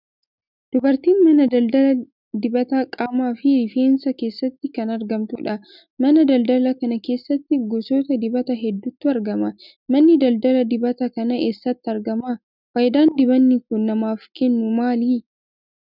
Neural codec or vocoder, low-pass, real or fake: none; 5.4 kHz; real